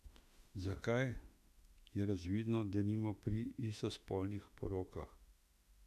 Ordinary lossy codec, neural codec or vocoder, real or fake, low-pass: none; autoencoder, 48 kHz, 32 numbers a frame, DAC-VAE, trained on Japanese speech; fake; 14.4 kHz